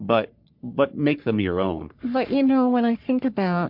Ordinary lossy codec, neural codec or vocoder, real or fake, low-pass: MP3, 48 kbps; codec, 44.1 kHz, 3.4 kbps, Pupu-Codec; fake; 5.4 kHz